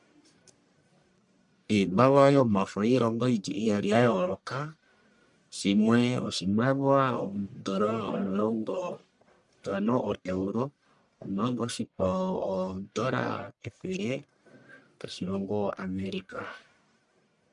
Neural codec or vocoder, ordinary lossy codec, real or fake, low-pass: codec, 44.1 kHz, 1.7 kbps, Pupu-Codec; MP3, 96 kbps; fake; 10.8 kHz